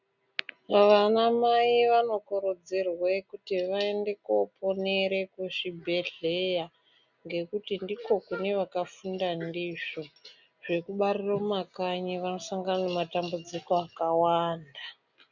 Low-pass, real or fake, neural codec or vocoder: 7.2 kHz; real; none